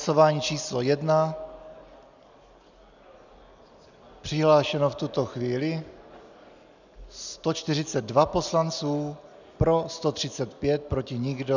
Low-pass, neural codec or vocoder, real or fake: 7.2 kHz; none; real